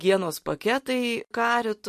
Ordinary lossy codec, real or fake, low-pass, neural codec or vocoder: MP3, 64 kbps; real; 14.4 kHz; none